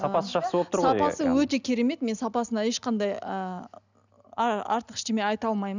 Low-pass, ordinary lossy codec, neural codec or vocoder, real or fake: 7.2 kHz; none; none; real